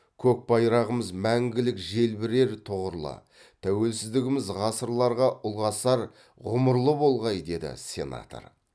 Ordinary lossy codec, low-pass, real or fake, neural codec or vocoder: none; none; real; none